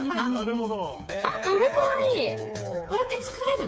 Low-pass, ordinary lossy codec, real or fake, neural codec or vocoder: none; none; fake; codec, 16 kHz, 4 kbps, FreqCodec, smaller model